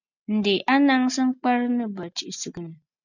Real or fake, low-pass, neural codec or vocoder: real; 7.2 kHz; none